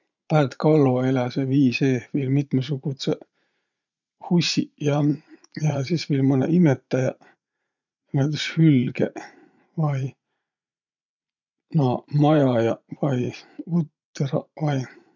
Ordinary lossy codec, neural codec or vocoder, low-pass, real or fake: none; vocoder, 44.1 kHz, 80 mel bands, Vocos; 7.2 kHz; fake